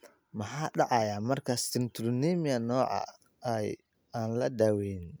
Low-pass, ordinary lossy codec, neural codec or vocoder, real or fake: none; none; none; real